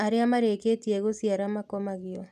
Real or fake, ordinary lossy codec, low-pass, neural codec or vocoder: real; none; 14.4 kHz; none